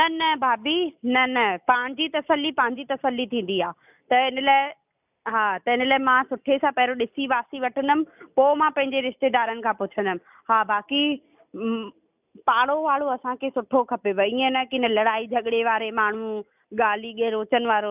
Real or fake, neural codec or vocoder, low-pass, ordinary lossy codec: real; none; 3.6 kHz; none